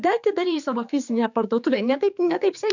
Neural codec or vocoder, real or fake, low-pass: codec, 16 kHz, 4 kbps, X-Codec, HuBERT features, trained on general audio; fake; 7.2 kHz